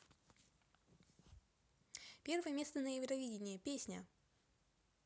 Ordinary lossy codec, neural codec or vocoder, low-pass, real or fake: none; none; none; real